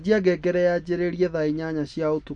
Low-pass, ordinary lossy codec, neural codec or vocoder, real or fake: 10.8 kHz; none; none; real